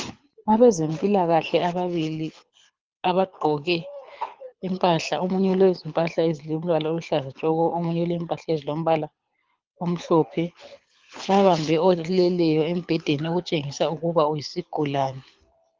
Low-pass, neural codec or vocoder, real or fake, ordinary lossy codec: 7.2 kHz; codec, 24 kHz, 6 kbps, HILCodec; fake; Opus, 24 kbps